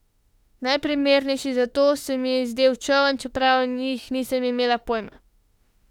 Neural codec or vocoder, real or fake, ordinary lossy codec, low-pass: autoencoder, 48 kHz, 32 numbers a frame, DAC-VAE, trained on Japanese speech; fake; none; 19.8 kHz